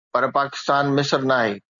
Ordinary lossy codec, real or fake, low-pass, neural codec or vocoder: MP3, 96 kbps; real; 7.2 kHz; none